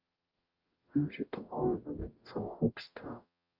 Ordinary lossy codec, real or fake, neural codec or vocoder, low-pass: Opus, 32 kbps; fake; codec, 44.1 kHz, 0.9 kbps, DAC; 5.4 kHz